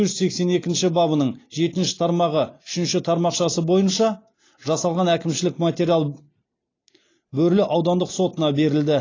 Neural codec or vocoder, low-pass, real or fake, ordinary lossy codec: none; 7.2 kHz; real; AAC, 32 kbps